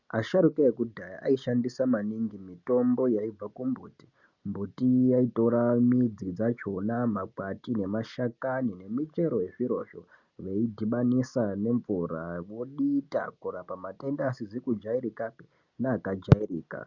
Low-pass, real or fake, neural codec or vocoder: 7.2 kHz; real; none